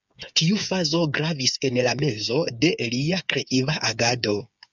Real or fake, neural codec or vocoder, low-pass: fake; codec, 16 kHz, 8 kbps, FreqCodec, smaller model; 7.2 kHz